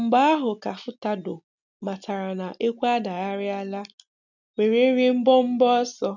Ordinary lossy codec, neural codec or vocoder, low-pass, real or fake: none; none; 7.2 kHz; real